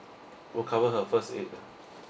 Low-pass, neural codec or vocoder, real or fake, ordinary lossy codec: none; none; real; none